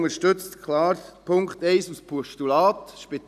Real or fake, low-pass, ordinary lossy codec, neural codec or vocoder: real; 14.4 kHz; none; none